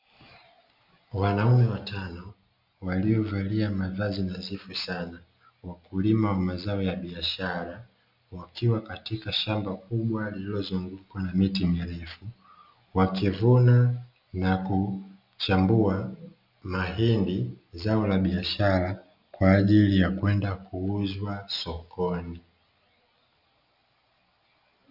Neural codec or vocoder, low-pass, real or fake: none; 5.4 kHz; real